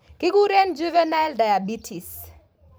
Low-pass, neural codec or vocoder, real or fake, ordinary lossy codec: none; vocoder, 44.1 kHz, 128 mel bands every 512 samples, BigVGAN v2; fake; none